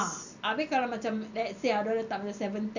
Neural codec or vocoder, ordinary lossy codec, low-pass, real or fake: none; none; 7.2 kHz; real